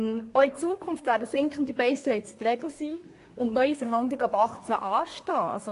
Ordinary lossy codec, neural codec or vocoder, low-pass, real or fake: AAC, 48 kbps; codec, 24 kHz, 1 kbps, SNAC; 10.8 kHz; fake